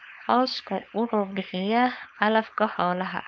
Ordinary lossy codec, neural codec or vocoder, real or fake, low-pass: none; codec, 16 kHz, 4.8 kbps, FACodec; fake; none